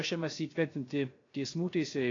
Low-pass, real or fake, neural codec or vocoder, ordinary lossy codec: 7.2 kHz; fake; codec, 16 kHz, 0.3 kbps, FocalCodec; AAC, 32 kbps